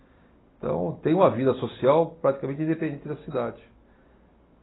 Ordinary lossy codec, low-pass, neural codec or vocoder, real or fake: AAC, 16 kbps; 7.2 kHz; none; real